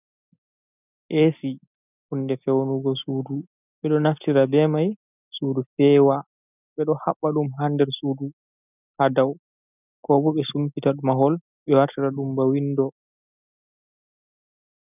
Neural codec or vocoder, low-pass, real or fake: none; 3.6 kHz; real